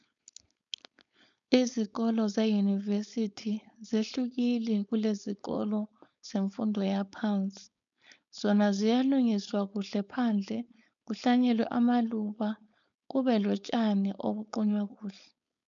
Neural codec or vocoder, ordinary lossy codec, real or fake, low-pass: codec, 16 kHz, 4.8 kbps, FACodec; MP3, 96 kbps; fake; 7.2 kHz